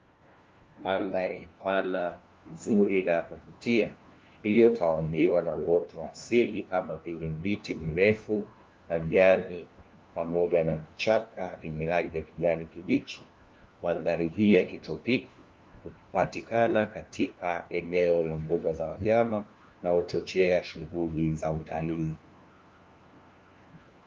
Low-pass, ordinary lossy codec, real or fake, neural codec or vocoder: 7.2 kHz; Opus, 32 kbps; fake; codec, 16 kHz, 1 kbps, FunCodec, trained on LibriTTS, 50 frames a second